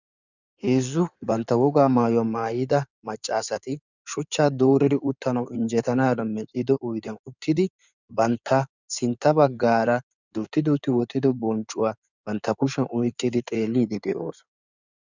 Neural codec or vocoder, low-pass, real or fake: codec, 16 kHz in and 24 kHz out, 2.2 kbps, FireRedTTS-2 codec; 7.2 kHz; fake